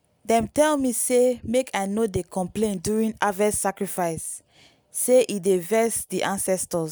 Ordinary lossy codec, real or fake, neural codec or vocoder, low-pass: none; real; none; none